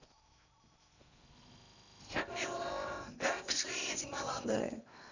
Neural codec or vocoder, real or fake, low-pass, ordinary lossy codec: codec, 16 kHz in and 24 kHz out, 0.8 kbps, FocalCodec, streaming, 65536 codes; fake; 7.2 kHz; none